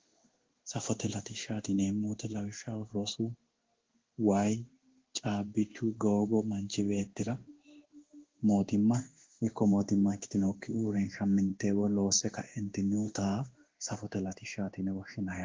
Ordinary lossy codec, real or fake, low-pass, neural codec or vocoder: Opus, 16 kbps; fake; 7.2 kHz; codec, 16 kHz in and 24 kHz out, 1 kbps, XY-Tokenizer